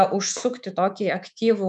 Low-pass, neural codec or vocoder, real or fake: 10.8 kHz; codec, 24 kHz, 3.1 kbps, DualCodec; fake